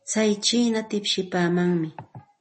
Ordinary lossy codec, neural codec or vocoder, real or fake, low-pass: MP3, 32 kbps; none; real; 9.9 kHz